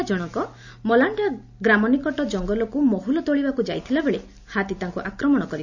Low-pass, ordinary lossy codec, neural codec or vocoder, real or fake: 7.2 kHz; none; none; real